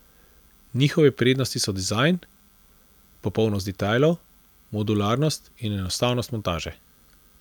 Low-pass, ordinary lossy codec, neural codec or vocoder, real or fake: 19.8 kHz; none; none; real